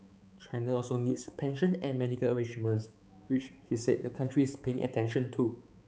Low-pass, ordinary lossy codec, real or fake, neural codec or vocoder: none; none; fake; codec, 16 kHz, 4 kbps, X-Codec, HuBERT features, trained on balanced general audio